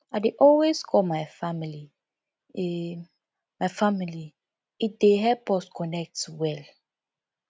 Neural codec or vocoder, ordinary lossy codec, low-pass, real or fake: none; none; none; real